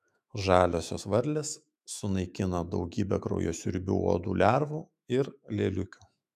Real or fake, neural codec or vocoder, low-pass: fake; autoencoder, 48 kHz, 128 numbers a frame, DAC-VAE, trained on Japanese speech; 14.4 kHz